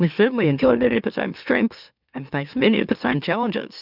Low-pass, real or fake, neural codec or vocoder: 5.4 kHz; fake; autoencoder, 44.1 kHz, a latent of 192 numbers a frame, MeloTTS